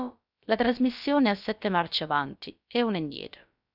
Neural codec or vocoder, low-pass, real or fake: codec, 16 kHz, about 1 kbps, DyCAST, with the encoder's durations; 5.4 kHz; fake